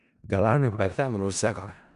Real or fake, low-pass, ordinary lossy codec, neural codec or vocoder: fake; 10.8 kHz; none; codec, 16 kHz in and 24 kHz out, 0.4 kbps, LongCat-Audio-Codec, four codebook decoder